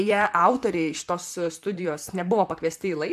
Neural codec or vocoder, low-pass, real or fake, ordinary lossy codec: vocoder, 44.1 kHz, 128 mel bands, Pupu-Vocoder; 14.4 kHz; fake; AAC, 96 kbps